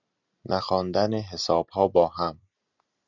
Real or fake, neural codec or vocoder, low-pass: real; none; 7.2 kHz